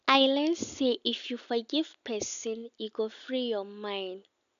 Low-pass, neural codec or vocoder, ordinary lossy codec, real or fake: 7.2 kHz; none; none; real